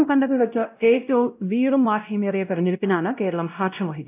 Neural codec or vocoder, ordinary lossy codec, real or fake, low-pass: codec, 16 kHz, 0.5 kbps, X-Codec, WavLM features, trained on Multilingual LibriSpeech; AAC, 32 kbps; fake; 3.6 kHz